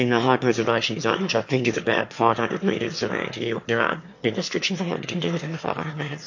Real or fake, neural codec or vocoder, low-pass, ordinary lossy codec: fake; autoencoder, 22.05 kHz, a latent of 192 numbers a frame, VITS, trained on one speaker; 7.2 kHz; MP3, 48 kbps